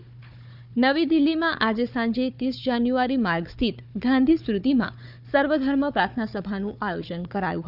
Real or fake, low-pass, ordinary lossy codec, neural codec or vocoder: fake; 5.4 kHz; none; codec, 16 kHz, 4 kbps, FunCodec, trained on Chinese and English, 50 frames a second